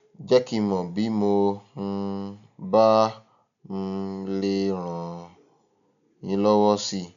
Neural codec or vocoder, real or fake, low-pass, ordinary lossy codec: none; real; 7.2 kHz; none